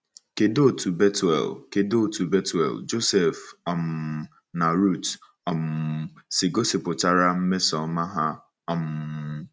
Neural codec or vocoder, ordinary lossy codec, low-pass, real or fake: none; none; none; real